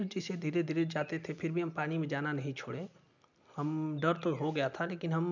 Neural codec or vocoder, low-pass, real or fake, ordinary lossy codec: none; 7.2 kHz; real; none